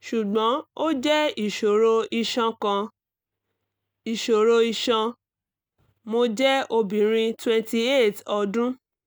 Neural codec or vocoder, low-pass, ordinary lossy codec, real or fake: none; none; none; real